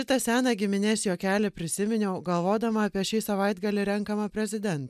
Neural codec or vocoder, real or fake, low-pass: none; real; 14.4 kHz